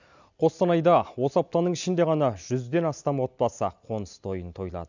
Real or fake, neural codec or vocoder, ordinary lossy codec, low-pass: real; none; none; 7.2 kHz